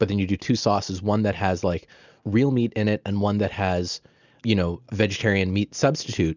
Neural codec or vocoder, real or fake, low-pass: none; real; 7.2 kHz